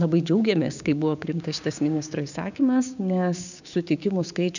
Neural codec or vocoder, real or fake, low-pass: codec, 16 kHz, 6 kbps, DAC; fake; 7.2 kHz